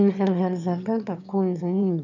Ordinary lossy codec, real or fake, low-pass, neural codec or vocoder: none; fake; 7.2 kHz; autoencoder, 22.05 kHz, a latent of 192 numbers a frame, VITS, trained on one speaker